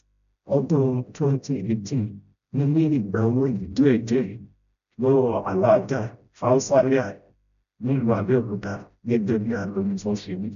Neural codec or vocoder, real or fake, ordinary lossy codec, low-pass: codec, 16 kHz, 0.5 kbps, FreqCodec, smaller model; fake; none; 7.2 kHz